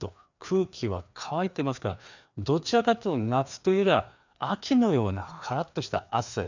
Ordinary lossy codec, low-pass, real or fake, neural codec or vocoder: none; 7.2 kHz; fake; codec, 16 kHz, 2 kbps, FreqCodec, larger model